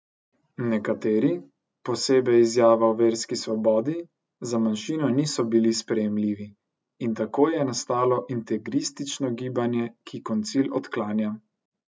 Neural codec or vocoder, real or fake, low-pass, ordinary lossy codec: none; real; none; none